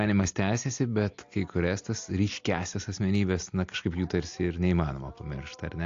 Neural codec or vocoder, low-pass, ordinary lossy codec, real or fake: none; 7.2 kHz; AAC, 48 kbps; real